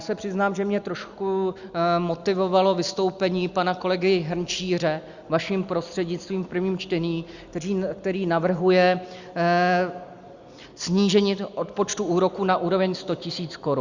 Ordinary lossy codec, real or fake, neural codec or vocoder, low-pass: Opus, 64 kbps; real; none; 7.2 kHz